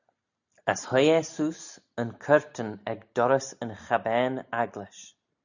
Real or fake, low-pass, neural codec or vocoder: real; 7.2 kHz; none